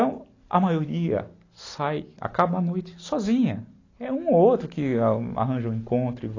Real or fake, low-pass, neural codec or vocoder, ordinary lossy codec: real; 7.2 kHz; none; AAC, 32 kbps